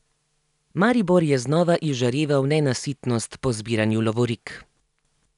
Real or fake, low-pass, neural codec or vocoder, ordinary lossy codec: real; 10.8 kHz; none; none